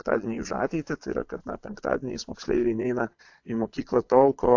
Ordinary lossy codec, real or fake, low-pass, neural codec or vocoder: AAC, 48 kbps; fake; 7.2 kHz; vocoder, 22.05 kHz, 80 mel bands, Vocos